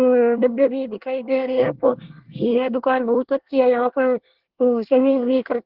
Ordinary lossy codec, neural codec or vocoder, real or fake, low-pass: Opus, 16 kbps; codec, 24 kHz, 1 kbps, SNAC; fake; 5.4 kHz